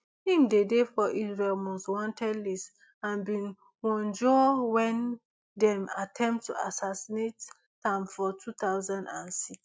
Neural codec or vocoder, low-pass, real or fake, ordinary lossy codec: none; none; real; none